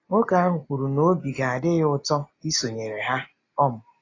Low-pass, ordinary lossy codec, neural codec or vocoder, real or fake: 7.2 kHz; AAC, 32 kbps; none; real